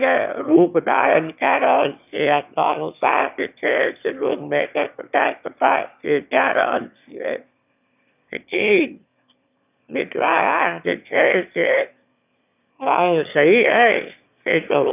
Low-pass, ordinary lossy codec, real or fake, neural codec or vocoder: 3.6 kHz; none; fake; autoencoder, 22.05 kHz, a latent of 192 numbers a frame, VITS, trained on one speaker